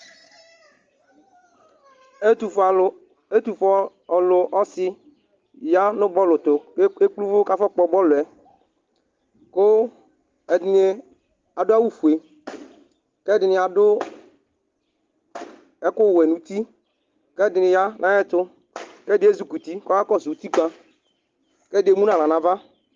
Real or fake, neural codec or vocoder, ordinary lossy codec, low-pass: real; none; Opus, 32 kbps; 7.2 kHz